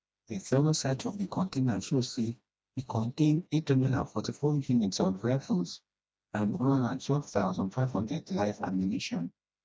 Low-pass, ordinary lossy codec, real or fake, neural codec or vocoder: none; none; fake; codec, 16 kHz, 1 kbps, FreqCodec, smaller model